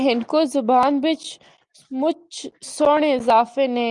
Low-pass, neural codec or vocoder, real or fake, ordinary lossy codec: 10.8 kHz; none; real; Opus, 32 kbps